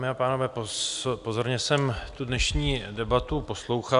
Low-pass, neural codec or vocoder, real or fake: 10.8 kHz; none; real